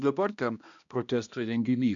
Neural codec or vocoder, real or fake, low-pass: codec, 16 kHz, 1 kbps, X-Codec, HuBERT features, trained on balanced general audio; fake; 7.2 kHz